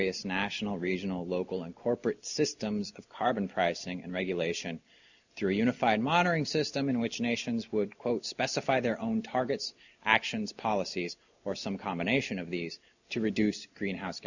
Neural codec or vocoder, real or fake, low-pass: none; real; 7.2 kHz